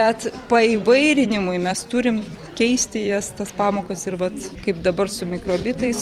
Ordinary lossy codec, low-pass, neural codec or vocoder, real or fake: Opus, 32 kbps; 19.8 kHz; vocoder, 44.1 kHz, 128 mel bands every 256 samples, BigVGAN v2; fake